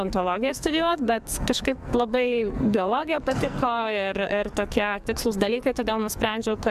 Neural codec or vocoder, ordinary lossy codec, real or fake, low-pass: codec, 44.1 kHz, 2.6 kbps, SNAC; AAC, 96 kbps; fake; 14.4 kHz